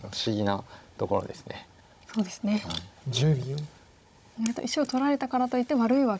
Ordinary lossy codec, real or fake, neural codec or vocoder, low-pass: none; fake; codec, 16 kHz, 16 kbps, FunCodec, trained on Chinese and English, 50 frames a second; none